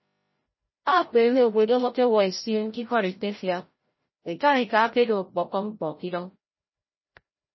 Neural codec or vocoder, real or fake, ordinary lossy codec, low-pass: codec, 16 kHz, 0.5 kbps, FreqCodec, larger model; fake; MP3, 24 kbps; 7.2 kHz